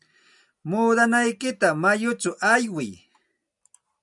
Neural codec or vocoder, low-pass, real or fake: none; 10.8 kHz; real